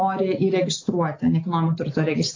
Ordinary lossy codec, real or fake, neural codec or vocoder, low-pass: AAC, 32 kbps; real; none; 7.2 kHz